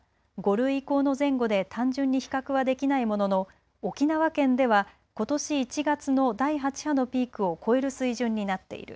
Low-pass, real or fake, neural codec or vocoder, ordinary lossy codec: none; real; none; none